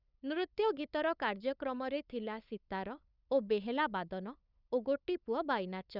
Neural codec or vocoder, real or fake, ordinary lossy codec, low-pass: codec, 16 kHz, 8 kbps, FunCodec, trained on LibriTTS, 25 frames a second; fake; none; 5.4 kHz